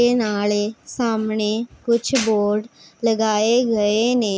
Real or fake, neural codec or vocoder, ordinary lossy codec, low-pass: real; none; none; none